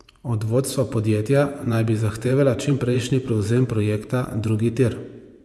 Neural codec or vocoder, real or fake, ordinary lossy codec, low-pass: vocoder, 24 kHz, 100 mel bands, Vocos; fake; none; none